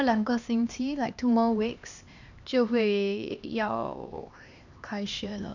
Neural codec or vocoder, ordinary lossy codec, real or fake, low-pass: codec, 16 kHz, 2 kbps, X-Codec, HuBERT features, trained on LibriSpeech; none; fake; 7.2 kHz